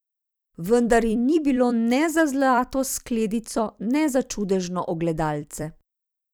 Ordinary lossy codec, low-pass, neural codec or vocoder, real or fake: none; none; vocoder, 44.1 kHz, 128 mel bands every 256 samples, BigVGAN v2; fake